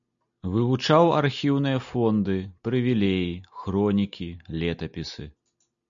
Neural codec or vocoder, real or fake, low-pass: none; real; 7.2 kHz